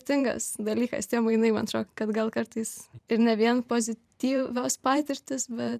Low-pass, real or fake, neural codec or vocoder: 14.4 kHz; fake; vocoder, 44.1 kHz, 128 mel bands every 512 samples, BigVGAN v2